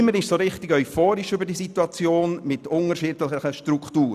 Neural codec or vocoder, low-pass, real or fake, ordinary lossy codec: none; 14.4 kHz; real; none